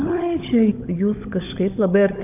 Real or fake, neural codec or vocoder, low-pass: fake; codec, 16 kHz, 16 kbps, FunCodec, trained on LibriTTS, 50 frames a second; 3.6 kHz